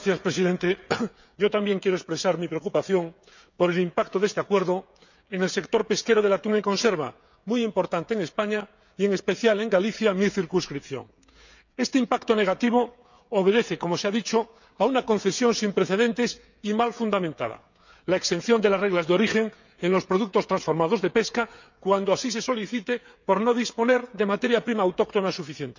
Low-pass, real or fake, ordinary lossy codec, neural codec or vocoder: 7.2 kHz; fake; none; codec, 16 kHz, 16 kbps, FreqCodec, smaller model